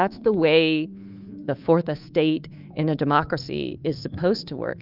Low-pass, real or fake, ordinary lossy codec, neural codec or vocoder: 5.4 kHz; fake; Opus, 24 kbps; codec, 24 kHz, 3.1 kbps, DualCodec